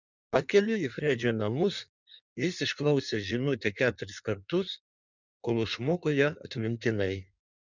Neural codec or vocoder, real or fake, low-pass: codec, 16 kHz in and 24 kHz out, 1.1 kbps, FireRedTTS-2 codec; fake; 7.2 kHz